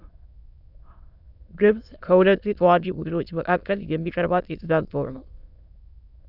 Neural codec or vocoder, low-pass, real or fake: autoencoder, 22.05 kHz, a latent of 192 numbers a frame, VITS, trained on many speakers; 5.4 kHz; fake